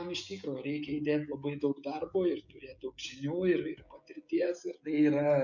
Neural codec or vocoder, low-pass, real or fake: codec, 16 kHz, 16 kbps, FreqCodec, smaller model; 7.2 kHz; fake